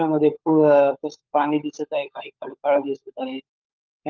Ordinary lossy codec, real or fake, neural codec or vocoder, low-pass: Opus, 24 kbps; fake; codec, 16 kHz, 16 kbps, FunCodec, trained on LibriTTS, 50 frames a second; 7.2 kHz